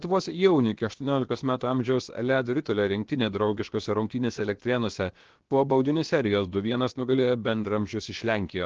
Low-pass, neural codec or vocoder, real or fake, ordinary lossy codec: 7.2 kHz; codec, 16 kHz, about 1 kbps, DyCAST, with the encoder's durations; fake; Opus, 16 kbps